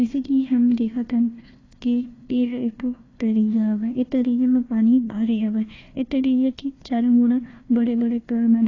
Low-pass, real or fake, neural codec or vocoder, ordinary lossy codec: 7.2 kHz; fake; codec, 16 kHz, 1 kbps, FunCodec, trained on Chinese and English, 50 frames a second; AAC, 32 kbps